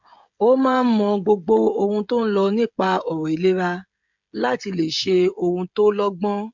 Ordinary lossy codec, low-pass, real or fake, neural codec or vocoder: MP3, 64 kbps; 7.2 kHz; fake; codec, 16 kHz, 16 kbps, FreqCodec, smaller model